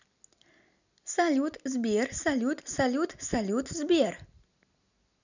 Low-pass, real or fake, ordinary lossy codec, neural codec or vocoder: 7.2 kHz; real; none; none